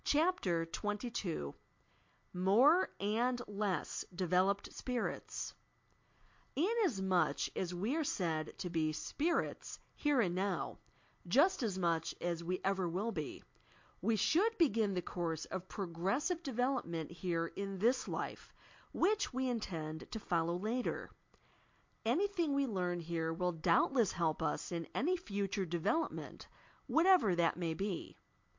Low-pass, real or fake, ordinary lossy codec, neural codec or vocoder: 7.2 kHz; real; MP3, 48 kbps; none